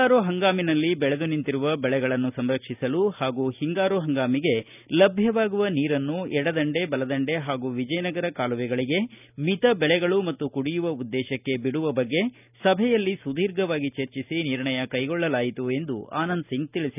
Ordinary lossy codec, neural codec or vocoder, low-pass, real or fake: none; none; 3.6 kHz; real